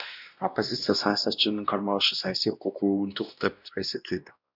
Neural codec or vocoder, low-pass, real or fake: codec, 16 kHz, 1 kbps, X-Codec, WavLM features, trained on Multilingual LibriSpeech; 5.4 kHz; fake